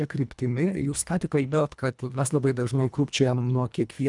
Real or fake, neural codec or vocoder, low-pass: fake; codec, 24 kHz, 1.5 kbps, HILCodec; 10.8 kHz